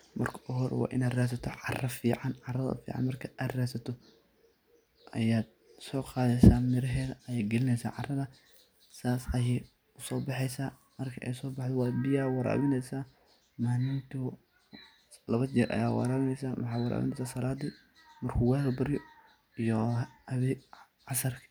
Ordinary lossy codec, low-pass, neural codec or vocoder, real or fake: none; none; none; real